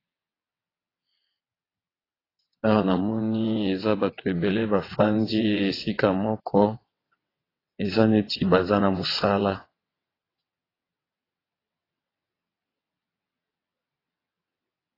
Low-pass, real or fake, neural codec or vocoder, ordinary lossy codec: 5.4 kHz; fake; vocoder, 22.05 kHz, 80 mel bands, WaveNeXt; AAC, 24 kbps